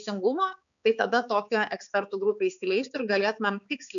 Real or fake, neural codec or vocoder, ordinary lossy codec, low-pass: fake; codec, 16 kHz, 4 kbps, X-Codec, HuBERT features, trained on balanced general audio; MP3, 96 kbps; 7.2 kHz